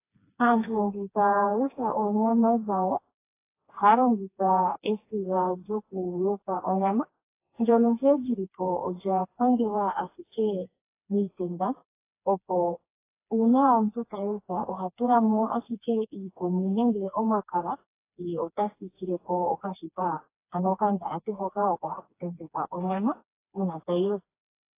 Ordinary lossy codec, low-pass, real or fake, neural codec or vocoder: AAC, 24 kbps; 3.6 kHz; fake; codec, 16 kHz, 2 kbps, FreqCodec, smaller model